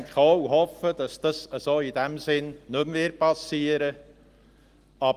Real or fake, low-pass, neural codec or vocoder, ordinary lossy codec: real; 14.4 kHz; none; Opus, 24 kbps